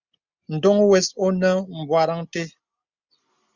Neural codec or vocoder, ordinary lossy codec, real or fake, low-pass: none; Opus, 64 kbps; real; 7.2 kHz